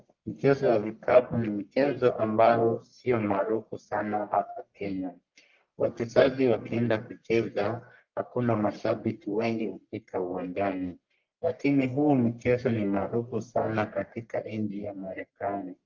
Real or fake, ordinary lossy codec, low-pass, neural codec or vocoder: fake; Opus, 24 kbps; 7.2 kHz; codec, 44.1 kHz, 1.7 kbps, Pupu-Codec